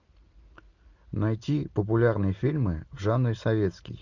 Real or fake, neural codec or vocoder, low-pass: real; none; 7.2 kHz